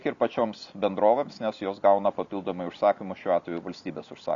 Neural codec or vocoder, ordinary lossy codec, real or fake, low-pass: none; Opus, 64 kbps; real; 7.2 kHz